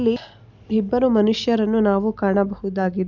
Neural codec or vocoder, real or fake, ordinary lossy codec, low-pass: none; real; none; 7.2 kHz